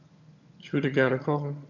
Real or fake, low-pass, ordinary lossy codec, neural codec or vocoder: fake; 7.2 kHz; none; vocoder, 22.05 kHz, 80 mel bands, HiFi-GAN